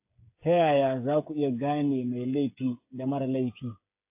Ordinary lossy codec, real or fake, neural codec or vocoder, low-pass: AAC, 32 kbps; fake; codec, 16 kHz, 8 kbps, FreqCodec, smaller model; 3.6 kHz